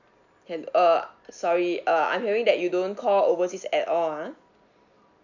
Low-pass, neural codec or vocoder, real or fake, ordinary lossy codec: 7.2 kHz; none; real; none